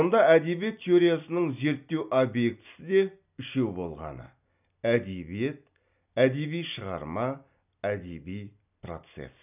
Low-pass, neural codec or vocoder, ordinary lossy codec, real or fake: 3.6 kHz; none; none; real